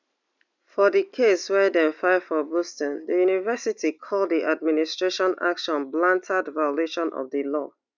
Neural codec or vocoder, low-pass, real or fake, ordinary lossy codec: none; 7.2 kHz; real; none